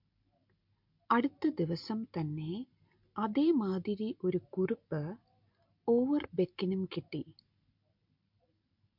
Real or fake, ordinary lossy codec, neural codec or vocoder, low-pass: real; MP3, 48 kbps; none; 5.4 kHz